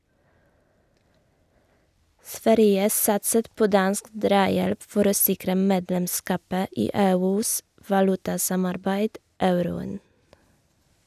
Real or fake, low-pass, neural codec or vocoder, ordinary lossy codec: real; 14.4 kHz; none; none